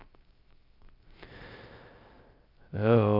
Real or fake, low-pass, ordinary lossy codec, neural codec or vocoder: fake; 5.4 kHz; Opus, 24 kbps; codec, 16 kHz, 0.3 kbps, FocalCodec